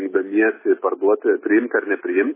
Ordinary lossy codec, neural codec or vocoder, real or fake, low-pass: MP3, 16 kbps; none; real; 3.6 kHz